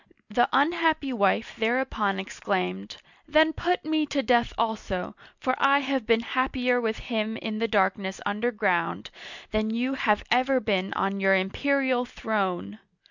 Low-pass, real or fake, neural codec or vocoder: 7.2 kHz; real; none